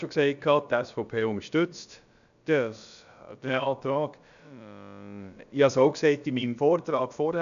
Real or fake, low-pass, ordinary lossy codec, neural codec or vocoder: fake; 7.2 kHz; none; codec, 16 kHz, about 1 kbps, DyCAST, with the encoder's durations